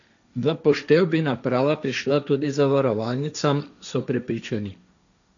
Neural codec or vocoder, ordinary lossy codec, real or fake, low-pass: codec, 16 kHz, 1.1 kbps, Voila-Tokenizer; none; fake; 7.2 kHz